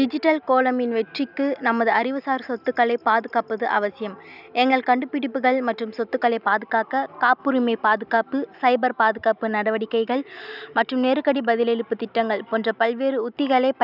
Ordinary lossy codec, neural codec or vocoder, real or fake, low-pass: none; none; real; 5.4 kHz